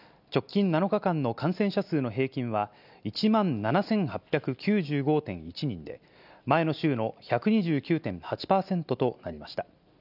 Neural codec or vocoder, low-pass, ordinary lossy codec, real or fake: none; 5.4 kHz; none; real